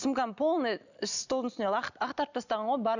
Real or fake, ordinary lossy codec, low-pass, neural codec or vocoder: real; none; 7.2 kHz; none